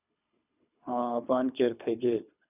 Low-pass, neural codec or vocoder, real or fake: 3.6 kHz; codec, 24 kHz, 3 kbps, HILCodec; fake